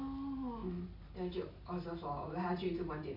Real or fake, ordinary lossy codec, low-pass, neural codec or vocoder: real; MP3, 32 kbps; 5.4 kHz; none